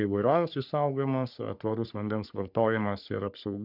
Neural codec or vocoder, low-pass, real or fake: codec, 44.1 kHz, 3.4 kbps, Pupu-Codec; 5.4 kHz; fake